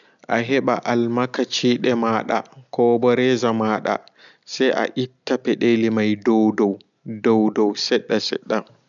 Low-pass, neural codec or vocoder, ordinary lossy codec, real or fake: 7.2 kHz; none; none; real